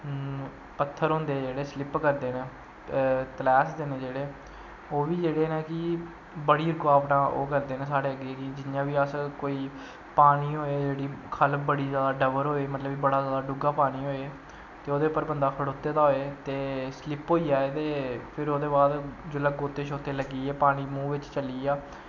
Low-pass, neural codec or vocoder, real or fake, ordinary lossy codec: 7.2 kHz; none; real; none